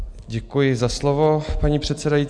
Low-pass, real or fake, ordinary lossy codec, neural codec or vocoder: 9.9 kHz; real; MP3, 96 kbps; none